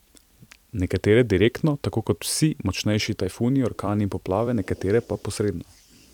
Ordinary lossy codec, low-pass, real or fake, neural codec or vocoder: none; 19.8 kHz; fake; vocoder, 44.1 kHz, 128 mel bands every 256 samples, BigVGAN v2